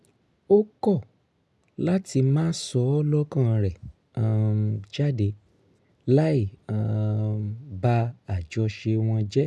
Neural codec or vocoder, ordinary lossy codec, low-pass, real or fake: none; none; none; real